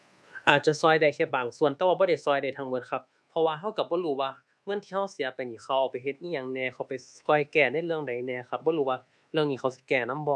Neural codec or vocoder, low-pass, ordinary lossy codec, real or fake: codec, 24 kHz, 1.2 kbps, DualCodec; none; none; fake